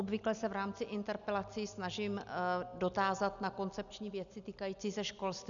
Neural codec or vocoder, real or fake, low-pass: none; real; 7.2 kHz